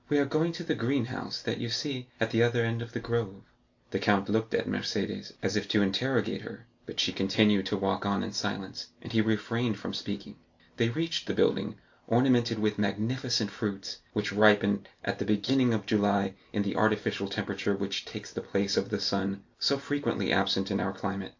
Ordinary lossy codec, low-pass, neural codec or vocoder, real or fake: AAC, 48 kbps; 7.2 kHz; none; real